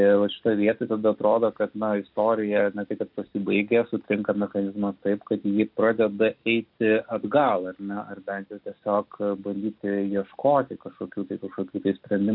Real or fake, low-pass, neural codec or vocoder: real; 5.4 kHz; none